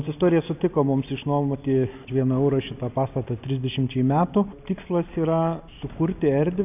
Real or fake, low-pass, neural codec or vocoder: real; 3.6 kHz; none